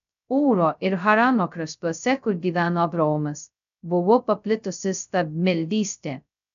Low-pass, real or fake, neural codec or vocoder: 7.2 kHz; fake; codec, 16 kHz, 0.2 kbps, FocalCodec